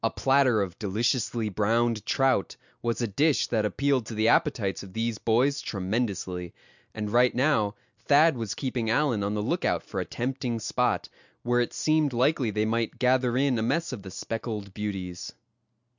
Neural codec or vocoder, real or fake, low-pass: none; real; 7.2 kHz